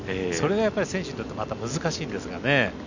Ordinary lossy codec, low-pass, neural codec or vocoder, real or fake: none; 7.2 kHz; none; real